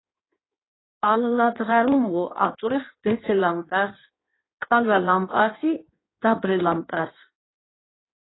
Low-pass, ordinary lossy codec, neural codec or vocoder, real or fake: 7.2 kHz; AAC, 16 kbps; codec, 16 kHz in and 24 kHz out, 1.1 kbps, FireRedTTS-2 codec; fake